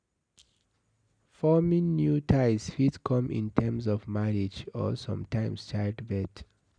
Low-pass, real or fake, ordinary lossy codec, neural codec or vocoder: 9.9 kHz; real; none; none